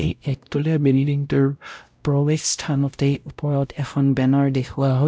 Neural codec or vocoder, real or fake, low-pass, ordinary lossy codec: codec, 16 kHz, 0.5 kbps, X-Codec, WavLM features, trained on Multilingual LibriSpeech; fake; none; none